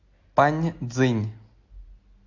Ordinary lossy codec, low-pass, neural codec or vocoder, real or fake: AAC, 48 kbps; 7.2 kHz; none; real